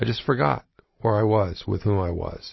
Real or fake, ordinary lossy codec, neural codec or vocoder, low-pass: real; MP3, 24 kbps; none; 7.2 kHz